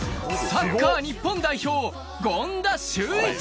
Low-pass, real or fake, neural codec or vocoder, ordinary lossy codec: none; real; none; none